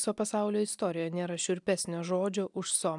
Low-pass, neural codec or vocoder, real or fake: 10.8 kHz; none; real